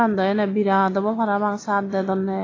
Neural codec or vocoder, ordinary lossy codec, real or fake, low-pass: none; AAC, 32 kbps; real; 7.2 kHz